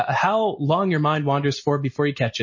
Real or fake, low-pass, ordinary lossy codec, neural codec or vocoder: real; 7.2 kHz; MP3, 32 kbps; none